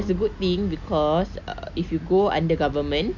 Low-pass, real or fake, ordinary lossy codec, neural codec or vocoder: 7.2 kHz; real; none; none